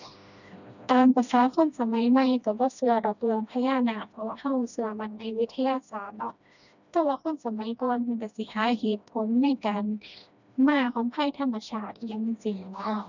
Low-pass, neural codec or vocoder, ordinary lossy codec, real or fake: 7.2 kHz; codec, 16 kHz, 1 kbps, FreqCodec, smaller model; none; fake